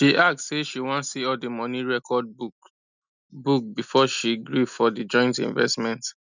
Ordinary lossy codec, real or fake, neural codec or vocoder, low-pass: none; real; none; 7.2 kHz